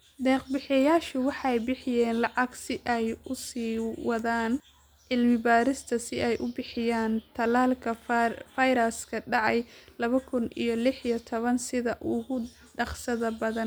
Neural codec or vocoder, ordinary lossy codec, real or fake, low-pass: none; none; real; none